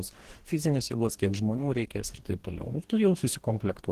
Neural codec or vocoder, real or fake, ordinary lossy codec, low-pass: codec, 44.1 kHz, 2.6 kbps, DAC; fake; Opus, 16 kbps; 14.4 kHz